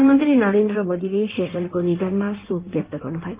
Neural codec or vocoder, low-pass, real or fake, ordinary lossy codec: codec, 16 kHz in and 24 kHz out, 1.1 kbps, FireRedTTS-2 codec; 3.6 kHz; fake; Opus, 24 kbps